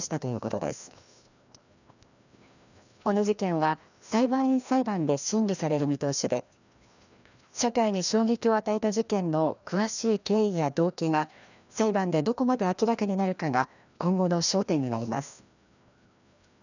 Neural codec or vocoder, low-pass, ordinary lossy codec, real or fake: codec, 16 kHz, 1 kbps, FreqCodec, larger model; 7.2 kHz; none; fake